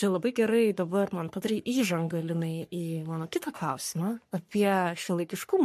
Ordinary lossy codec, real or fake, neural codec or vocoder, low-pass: MP3, 64 kbps; fake; codec, 44.1 kHz, 3.4 kbps, Pupu-Codec; 14.4 kHz